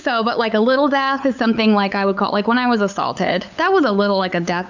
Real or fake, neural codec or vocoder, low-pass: fake; codec, 16 kHz, 8 kbps, FunCodec, trained on LibriTTS, 25 frames a second; 7.2 kHz